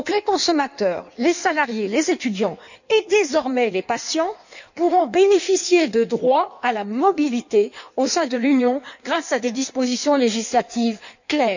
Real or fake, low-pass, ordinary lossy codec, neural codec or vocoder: fake; 7.2 kHz; none; codec, 16 kHz in and 24 kHz out, 1.1 kbps, FireRedTTS-2 codec